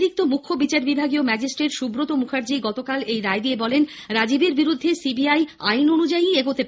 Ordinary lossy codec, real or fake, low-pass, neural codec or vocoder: none; real; none; none